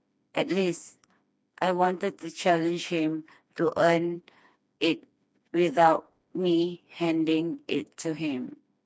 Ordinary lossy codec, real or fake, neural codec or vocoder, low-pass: none; fake; codec, 16 kHz, 2 kbps, FreqCodec, smaller model; none